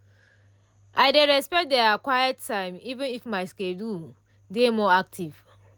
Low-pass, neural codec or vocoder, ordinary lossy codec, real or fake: none; none; none; real